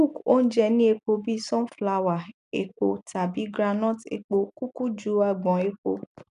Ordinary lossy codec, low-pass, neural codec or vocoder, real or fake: MP3, 96 kbps; 10.8 kHz; none; real